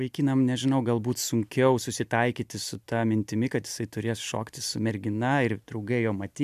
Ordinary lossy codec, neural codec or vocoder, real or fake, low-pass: MP3, 96 kbps; none; real; 14.4 kHz